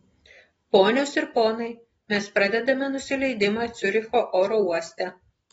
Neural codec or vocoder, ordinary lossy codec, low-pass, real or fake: none; AAC, 24 kbps; 19.8 kHz; real